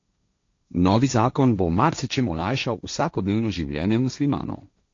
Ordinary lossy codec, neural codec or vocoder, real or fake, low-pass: AAC, 48 kbps; codec, 16 kHz, 1.1 kbps, Voila-Tokenizer; fake; 7.2 kHz